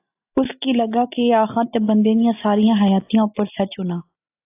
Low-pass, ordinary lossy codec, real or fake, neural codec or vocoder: 3.6 kHz; AAC, 24 kbps; real; none